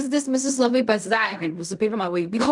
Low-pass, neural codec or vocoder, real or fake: 10.8 kHz; codec, 16 kHz in and 24 kHz out, 0.4 kbps, LongCat-Audio-Codec, fine tuned four codebook decoder; fake